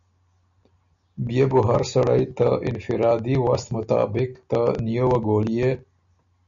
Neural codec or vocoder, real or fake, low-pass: none; real; 7.2 kHz